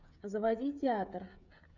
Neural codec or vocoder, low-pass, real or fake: codec, 16 kHz, 16 kbps, FreqCodec, smaller model; 7.2 kHz; fake